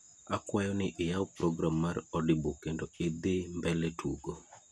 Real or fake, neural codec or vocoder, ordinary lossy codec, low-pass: real; none; none; none